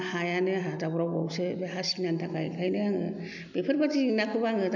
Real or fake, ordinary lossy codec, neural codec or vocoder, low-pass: real; none; none; 7.2 kHz